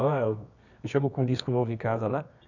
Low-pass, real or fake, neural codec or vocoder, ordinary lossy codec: 7.2 kHz; fake; codec, 24 kHz, 0.9 kbps, WavTokenizer, medium music audio release; none